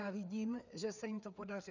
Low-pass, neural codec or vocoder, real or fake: 7.2 kHz; codec, 16 kHz, 4 kbps, FreqCodec, larger model; fake